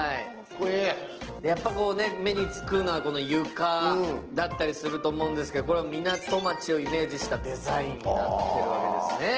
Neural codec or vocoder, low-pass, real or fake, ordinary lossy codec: none; 7.2 kHz; real; Opus, 16 kbps